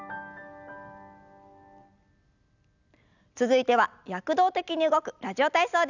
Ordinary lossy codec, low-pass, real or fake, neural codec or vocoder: none; 7.2 kHz; real; none